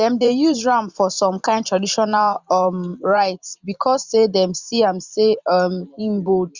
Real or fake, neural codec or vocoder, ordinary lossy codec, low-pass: fake; vocoder, 44.1 kHz, 128 mel bands every 512 samples, BigVGAN v2; Opus, 64 kbps; 7.2 kHz